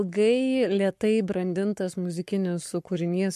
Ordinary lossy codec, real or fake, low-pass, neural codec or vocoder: MP3, 64 kbps; fake; 14.4 kHz; codec, 44.1 kHz, 7.8 kbps, Pupu-Codec